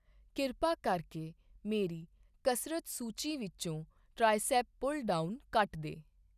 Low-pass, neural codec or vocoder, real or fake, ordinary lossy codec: 14.4 kHz; none; real; none